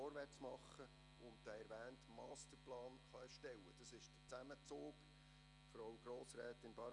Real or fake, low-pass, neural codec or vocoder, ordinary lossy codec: real; 10.8 kHz; none; none